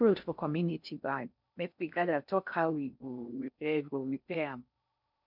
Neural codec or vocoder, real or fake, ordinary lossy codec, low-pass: codec, 16 kHz in and 24 kHz out, 0.6 kbps, FocalCodec, streaming, 4096 codes; fake; none; 5.4 kHz